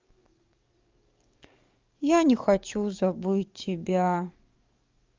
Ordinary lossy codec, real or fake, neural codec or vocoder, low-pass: Opus, 24 kbps; fake; codec, 44.1 kHz, 7.8 kbps, DAC; 7.2 kHz